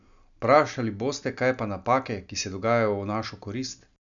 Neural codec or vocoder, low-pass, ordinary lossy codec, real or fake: none; 7.2 kHz; none; real